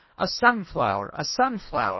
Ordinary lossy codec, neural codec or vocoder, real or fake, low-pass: MP3, 24 kbps; codec, 24 kHz, 1.5 kbps, HILCodec; fake; 7.2 kHz